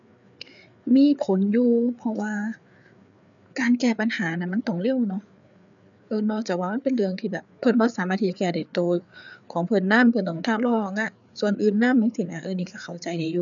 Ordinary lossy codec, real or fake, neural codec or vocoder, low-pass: none; fake; codec, 16 kHz, 4 kbps, FreqCodec, larger model; 7.2 kHz